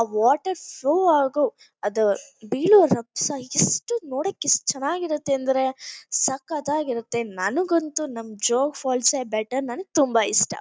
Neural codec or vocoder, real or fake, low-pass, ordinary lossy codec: none; real; none; none